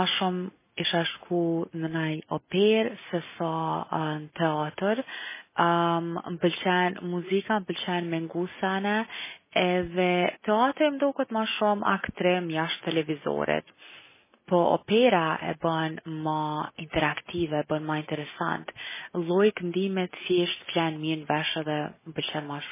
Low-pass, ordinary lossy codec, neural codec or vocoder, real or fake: 3.6 kHz; MP3, 16 kbps; none; real